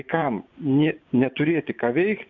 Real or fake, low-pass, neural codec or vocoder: fake; 7.2 kHz; vocoder, 24 kHz, 100 mel bands, Vocos